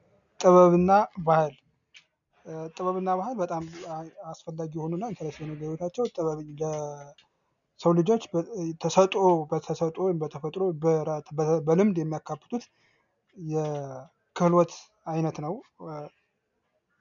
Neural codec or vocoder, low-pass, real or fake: none; 7.2 kHz; real